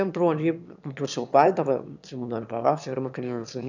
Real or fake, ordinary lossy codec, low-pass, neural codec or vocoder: fake; none; 7.2 kHz; autoencoder, 22.05 kHz, a latent of 192 numbers a frame, VITS, trained on one speaker